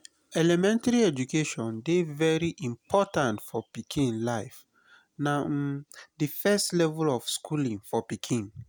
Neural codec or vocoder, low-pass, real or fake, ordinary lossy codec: none; none; real; none